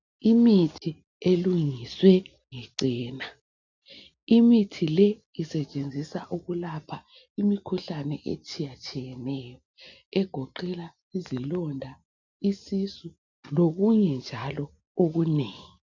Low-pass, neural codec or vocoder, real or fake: 7.2 kHz; none; real